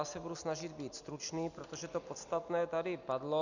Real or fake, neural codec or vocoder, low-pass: real; none; 7.2 kHz